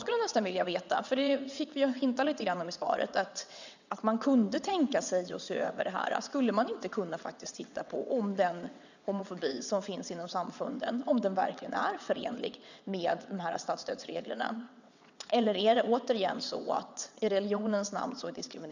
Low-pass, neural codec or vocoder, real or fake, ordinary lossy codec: 7.2 kHz; vocoder, 22.05 kHz, 80 mel bands, WaveNeXt; fake; none